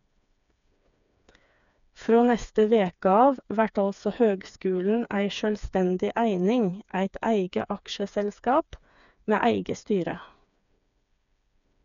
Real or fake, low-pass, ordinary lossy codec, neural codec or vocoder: fake; 7.2 kHz; none; codec, 16 kHz, 4 kbps, FreqCodec, smaller model